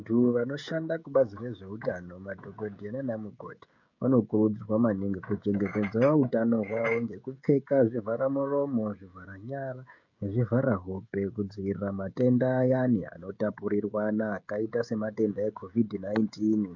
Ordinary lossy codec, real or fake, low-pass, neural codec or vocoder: MP3, 48 kbps; fake; 7.2 kHz; codec, 16 kHz, 16 kbps, FreqCodec, smaller model